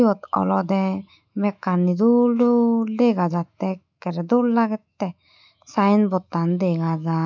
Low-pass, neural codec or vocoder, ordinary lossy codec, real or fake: 7.2 kHz; autoencoder, 48 kHz, 128 numbers a frame, DAC-VAE, trained on Japanese speech; MP3, 64 kbps; fake